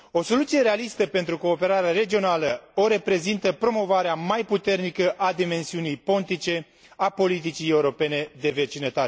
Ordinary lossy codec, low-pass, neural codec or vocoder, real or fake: none; none; none; real